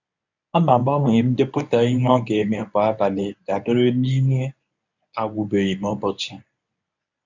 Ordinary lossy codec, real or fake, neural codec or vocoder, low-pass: none; fake; codec, 24 kHz, 0.9 kbps, WavTokenizer, medium speech release version 1; 7.2 kHz